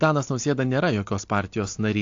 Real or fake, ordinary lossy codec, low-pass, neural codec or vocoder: real; AAC, 48 kbps; 7.2 kHz; none